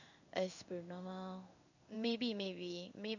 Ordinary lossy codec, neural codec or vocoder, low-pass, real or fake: none; codec, 16 kHz in and 24 kHz out, 1 kbps, XY-Tokenizer; 7.2 kHz; fake